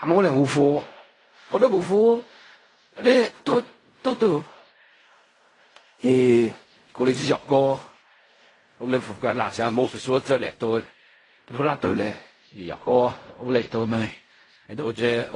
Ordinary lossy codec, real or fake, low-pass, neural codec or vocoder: AAC, 32 kbps; fake; 10.8 kHz; codec, 16 kHz in and 24 kHz out, 0.4 kbps, LongCat-Audio-Codec, fine tuned four codebook decoder